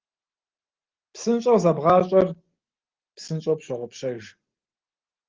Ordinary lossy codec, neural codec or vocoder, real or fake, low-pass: Opus, 16 kbps; none; real; 7.2 kHz